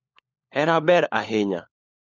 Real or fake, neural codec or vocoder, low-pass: fake; codec, 16 kHz, 4 kbps, FunCodec, trained on LibriTTS, 50 frames a second; 7.2 kHz